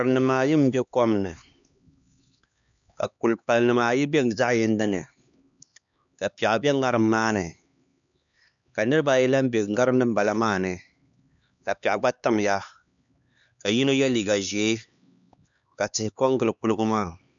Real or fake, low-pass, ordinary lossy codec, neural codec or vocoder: fake; 7.2 kHz; MP3, 96 kbps; codec, 16 kHz, 2 kbps, X-Codec, HuBERT features, trained on LibriSpeech